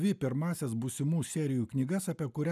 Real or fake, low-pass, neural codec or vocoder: real; 14.4 kHz; none